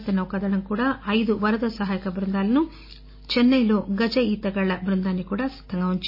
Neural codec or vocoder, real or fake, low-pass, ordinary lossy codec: none; real; 5.4 kHz; MP3, 24 kbps